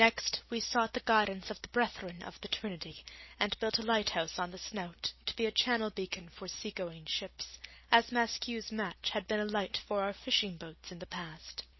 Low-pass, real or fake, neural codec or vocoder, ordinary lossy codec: 7.2 kHz; real; none; MP3, 24 kbps